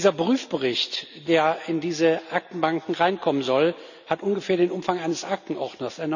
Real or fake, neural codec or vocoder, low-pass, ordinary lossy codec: real; none; 7.2 kHz; none